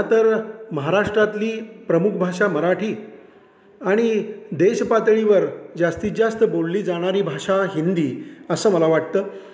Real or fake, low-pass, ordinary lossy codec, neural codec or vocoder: real; none; none; none